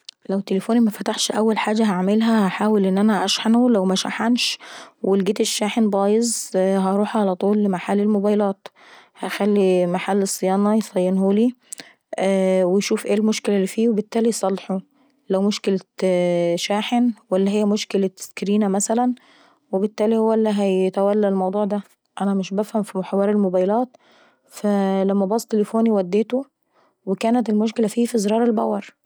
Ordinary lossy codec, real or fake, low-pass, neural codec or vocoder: none; real; none; none